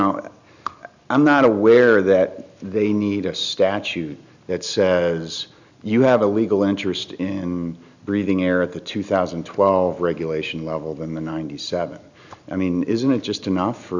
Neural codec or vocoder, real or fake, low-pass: none; real; 7.2 kHz